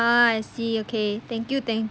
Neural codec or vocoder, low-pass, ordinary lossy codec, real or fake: none; none; none; real